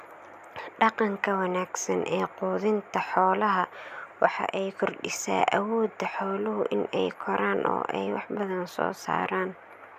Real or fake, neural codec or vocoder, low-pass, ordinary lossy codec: real; none; 14.4 kHz; none